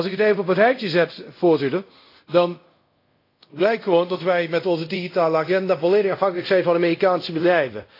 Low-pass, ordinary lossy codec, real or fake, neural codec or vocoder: 5.4 kHz; AAC, 32 kbps; fake; codec, 24 kHz, 0.5 kbps, DualCodec